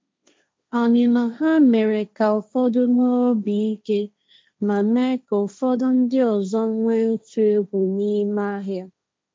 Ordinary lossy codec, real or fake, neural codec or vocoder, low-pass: none; fake; codec, 16 kHz, 1.1 kbps, Voila-Tokenizer; none